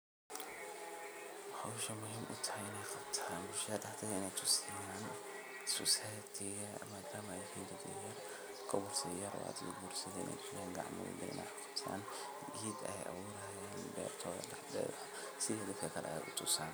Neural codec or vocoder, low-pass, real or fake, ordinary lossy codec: none; none; real; none